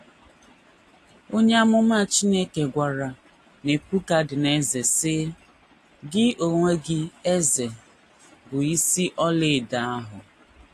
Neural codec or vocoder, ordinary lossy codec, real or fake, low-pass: none; AAC, 48 kbps; real; 14.4 kHz